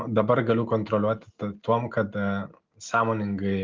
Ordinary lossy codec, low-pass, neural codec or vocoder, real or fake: Opus, 24 kbps; 7.2 kHz; none; real